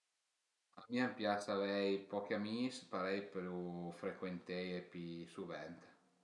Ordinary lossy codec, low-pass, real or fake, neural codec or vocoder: none; 10.8 kHz; real; none